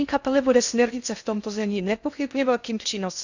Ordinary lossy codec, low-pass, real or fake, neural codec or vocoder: none; 7.2 kHz; fake; codec, 16 kHz in and 24 kHz out, 0.6 kbps, FocalCodec, streaming, 2048 codes